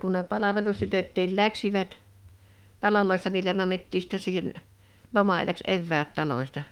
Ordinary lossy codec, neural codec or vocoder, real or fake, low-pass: Opus, 32 kbps; autoencoder, 48 kHz, 32 numbers a frame, DAC-VAE, trained on Japanese speech; fake; 19.8 kHz